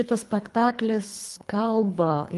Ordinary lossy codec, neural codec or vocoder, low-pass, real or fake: Opus, 24 kbps; codec, 24 kHz, 3 kbps, HILCodec; 10.8 kHz; fake